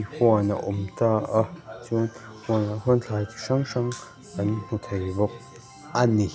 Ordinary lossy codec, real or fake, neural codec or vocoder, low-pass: none; real; none; none